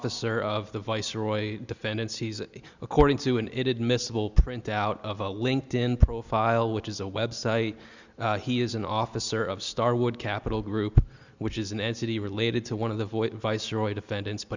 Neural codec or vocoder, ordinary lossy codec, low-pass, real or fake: none; Opus, 64 kbps; 7.2 kHz; real